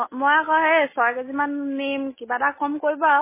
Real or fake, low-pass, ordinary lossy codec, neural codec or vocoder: real; 3.6 kHz; MP3, 16 kbps; none